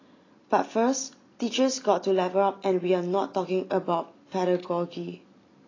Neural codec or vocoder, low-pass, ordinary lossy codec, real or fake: none; 7.2 kHz; AAC, 32 kbps; real